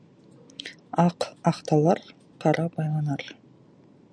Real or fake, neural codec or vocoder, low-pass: real; none; 9.9 kHz